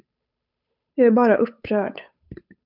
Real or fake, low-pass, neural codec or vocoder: fake; 5.4 kHz; codec, 16 kHz, 8 kbps, FunCodec, trained on Chinese and English, 25 frames a second